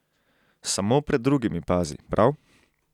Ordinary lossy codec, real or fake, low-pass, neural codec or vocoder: none; real; 19.8 kHz; none